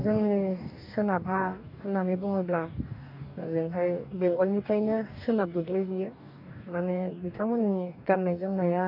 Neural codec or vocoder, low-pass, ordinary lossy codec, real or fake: codec, 44.1 kHz, 2.6 kbps, DAC; 5.4 kHz; none; fake